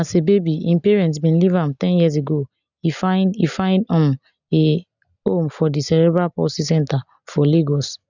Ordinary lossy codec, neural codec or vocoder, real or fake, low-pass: none; none; real; 7.2 kHz